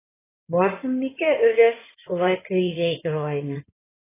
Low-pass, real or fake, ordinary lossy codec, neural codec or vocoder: 3.6 kHz; fake; AAC, 16 kbps; codec, 16 kHz in and 24 kHz out, 2.2 kbps, FireRedTTS-2 codec